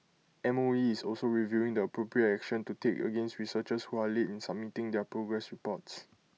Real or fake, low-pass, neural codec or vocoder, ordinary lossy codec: real; none; none; none